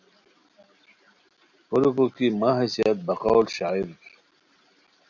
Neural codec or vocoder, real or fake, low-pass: none; real; 7.2 kHz